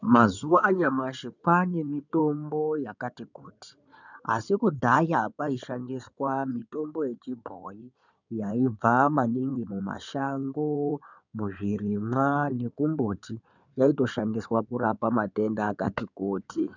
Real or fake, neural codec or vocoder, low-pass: fake; codec, 16 kHz in and 24 kHz out, 2.2 kbps, FireRedTTS-2 codec; 7.2 kHz